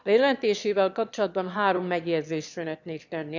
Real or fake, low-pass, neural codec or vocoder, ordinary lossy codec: fake; 7.2 kHz; autoencoder, 22.05 kHz, a latent of 192 numbers a frame, VITS, trained on one speaker; none